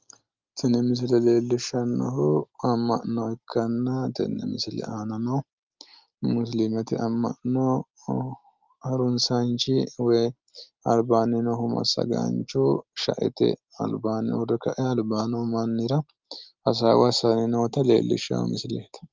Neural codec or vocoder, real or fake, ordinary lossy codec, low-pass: none; real; Opus, 32 kbps; 7.2 kHz